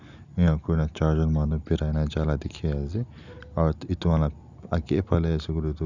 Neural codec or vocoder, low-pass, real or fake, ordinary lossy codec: none; 7.2 kHz; real; none